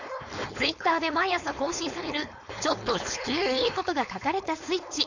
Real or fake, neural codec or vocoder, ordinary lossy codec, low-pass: fake; codec, 16 kHz, 4.8 kbps, FACodec; none; 7.2 kHz